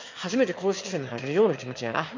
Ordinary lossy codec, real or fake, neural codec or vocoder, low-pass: MP3, 48 kbps; fake; autoencoder, 22.05 kHz, a latent of 192 numbers a frame, VITS, trained on one speaker; 7.2 kHz